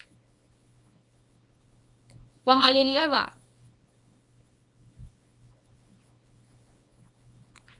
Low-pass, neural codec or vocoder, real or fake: 10.8 kHz; codec, 24 kHz, 0.9 kbps, WavTokenizer, small release; fake